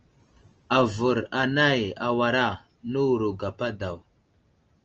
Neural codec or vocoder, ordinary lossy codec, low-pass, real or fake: none; Opus, 24 kbps; 7.2 kHz; real